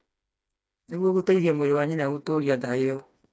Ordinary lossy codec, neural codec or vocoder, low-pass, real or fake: none; codec, 16 kHz, 2 kbps, FreqCodec, smaller model; none; fake